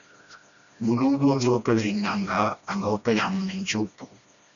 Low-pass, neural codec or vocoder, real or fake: 7.2 kHz; codec, 16 kHz, 1 kbps, FreqCodec, smaller model; fake